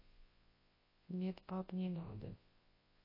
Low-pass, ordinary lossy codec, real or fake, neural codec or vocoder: 5.4 kHz; MP3, 24 kbps; fake; codec, 24 kHz, 0.9 kbps, WavTokenizer, large speech release